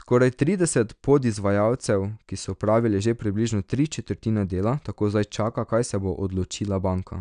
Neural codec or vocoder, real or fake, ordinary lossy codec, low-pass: none; real; none; 9.9 kHz